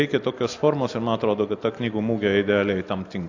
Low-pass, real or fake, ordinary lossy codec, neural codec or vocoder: 7.2 kHz; real; AAC, 48 kbps; none